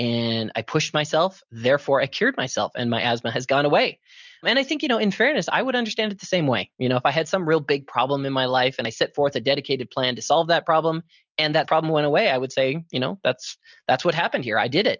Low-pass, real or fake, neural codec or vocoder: 7.2 kHz; real; none